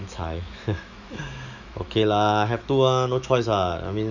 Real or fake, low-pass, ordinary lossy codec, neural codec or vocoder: fake; 7.2 kHz; none; autoencoder, 48 kHz, 128 numbers a frame, DAC-VAE, trained on Japanese speech